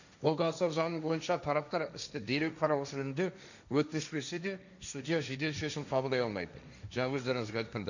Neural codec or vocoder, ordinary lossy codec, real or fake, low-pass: codec, 16 kHz, 1.1 kbps, Voila-Tokenizer; none; fake; 7.2 kHz